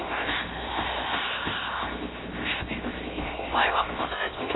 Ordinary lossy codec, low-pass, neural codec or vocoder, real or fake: AAC, 16 kbps; 7.2 kHz; codec, 16 kHz, 0.7 kbps, FocalCodec; fake